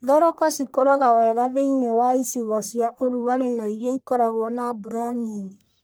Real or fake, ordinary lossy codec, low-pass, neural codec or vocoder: fake; none; none; codec, 44.1 kHz, 1.7 kbps, Pupu-Codec